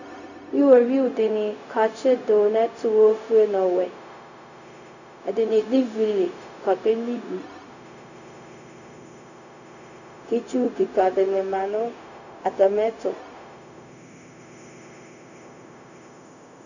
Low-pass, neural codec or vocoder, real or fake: 7.2 kHz; codec, 16 kHz, 0.4 kbps, LongCat-Audio-Codec; fake